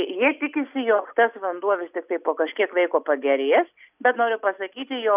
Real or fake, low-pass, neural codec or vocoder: real; 3.6 kHz; none